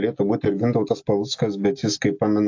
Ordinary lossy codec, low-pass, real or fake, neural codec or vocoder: AAC, 48 kbps; 7.2 kHz; real; none